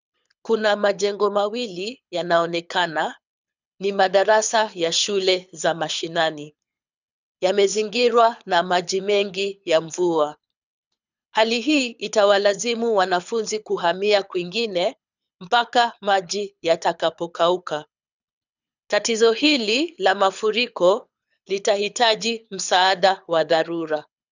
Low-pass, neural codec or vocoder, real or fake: 7.2 kHz; codec, 24 kHz, 6 kbps, HILCodec; fake